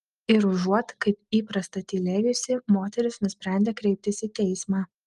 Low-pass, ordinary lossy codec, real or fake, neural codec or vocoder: 10.8 kHz; Opus, 32 kbps; real; none